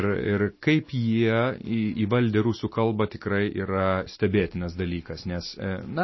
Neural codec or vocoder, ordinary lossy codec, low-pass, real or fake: none; MP3, 24 kbps; 7.2 kHz; real